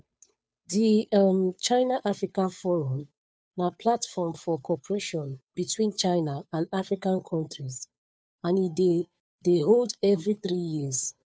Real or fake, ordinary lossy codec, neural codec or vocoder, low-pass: fake; none; codec, 16 kHz, 2 kbps, FunCodec, trained on Chinese and English, 25 frames a second; none